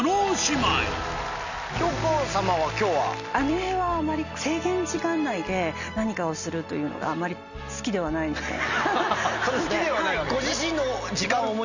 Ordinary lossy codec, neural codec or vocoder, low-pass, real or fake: none; none; 7.2 kHz; real